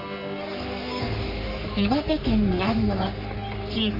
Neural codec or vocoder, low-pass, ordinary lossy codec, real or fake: codec, 44.1 kHz, 3.4 kbps, Pupu-Codec; 5.4 kHz; AAC, 48 kbps; fake